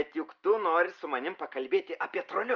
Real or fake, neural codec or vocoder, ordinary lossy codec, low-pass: real; none; Opus, 24 kbps; 7.2 kHz